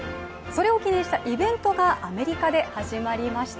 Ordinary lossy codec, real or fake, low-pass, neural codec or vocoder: none; real; none; none